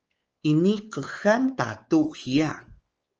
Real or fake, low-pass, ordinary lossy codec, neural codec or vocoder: fake; 7.2 kHz; Opus, 24 kbps; codec, 16 kHz, 4 kbps, X-Codec, WavLM features, trained on Multilingual LibriSpeech